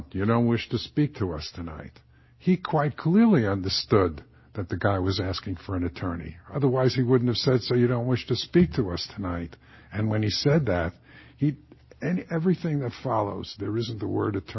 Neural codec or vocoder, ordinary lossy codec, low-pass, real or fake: none; MP3, 24 kbps; 7.2 kHz; real